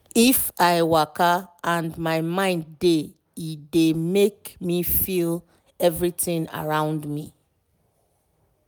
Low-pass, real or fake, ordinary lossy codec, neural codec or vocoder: none; real; none; none